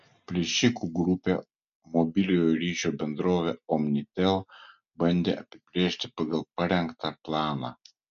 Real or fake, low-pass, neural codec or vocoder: real; 7.2 kHz; none